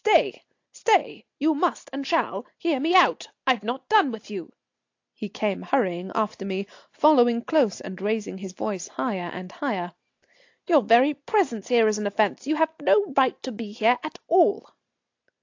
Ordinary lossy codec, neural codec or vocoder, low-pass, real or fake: AAC, 48 kbps; none; 7.2 kHz; real